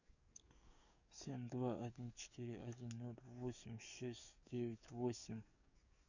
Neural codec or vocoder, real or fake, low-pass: codec, 44.1 kHz, 7.8 kbps, DAC; fake; 7.2 kHz